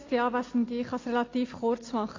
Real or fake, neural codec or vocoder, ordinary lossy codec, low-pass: real; none; AAC, 32 kbps; 7.2 kHz